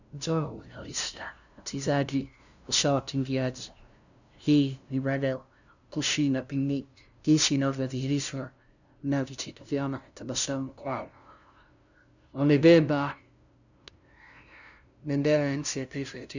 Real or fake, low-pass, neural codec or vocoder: fake; 7.2 kHz; codec, 16 kHz, 0.5 kbps, FunCodec, trained on LibriTTS, 25 frames a second